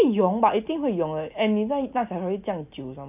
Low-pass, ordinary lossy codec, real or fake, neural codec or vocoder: 3.6 kHz; none; real; none